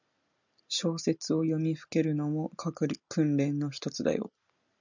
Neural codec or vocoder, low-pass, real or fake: none; 7.2 kHz; real